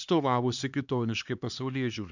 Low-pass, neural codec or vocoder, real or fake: 7.2 kHz; codec, 16 kHz, 2 kbps, X-Codec, HuBERT features, trained on LibriSpeech; fake